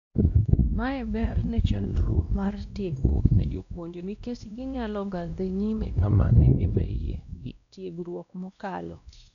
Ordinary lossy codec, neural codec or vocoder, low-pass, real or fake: none; codec, 16 kHz, 1 kbps, X-Codec, WavLM features, trained on Multilingual LibriSpeech; 7.2 kHz; fake